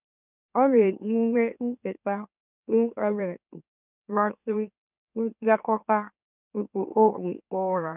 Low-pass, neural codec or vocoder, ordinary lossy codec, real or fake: 3.6 kHz; autoencoder, 44.1 kHz, a latent of 192 numbers a frame, MeloTTS; none; fake